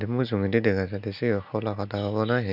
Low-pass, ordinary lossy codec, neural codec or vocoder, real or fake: 5.4 kHz; none; none; real